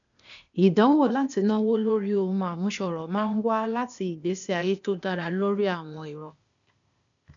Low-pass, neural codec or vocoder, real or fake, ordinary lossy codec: 7.2 kHz; codec, 16 kHz, 0.8 kbps, ZipCodec; fake; none